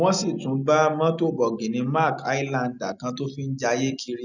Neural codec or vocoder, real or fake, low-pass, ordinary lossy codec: none; real; 7.2 kHz; none